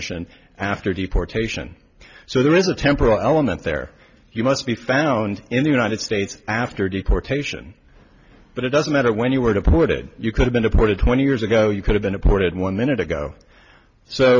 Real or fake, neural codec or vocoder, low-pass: real; none; 7.2 kHz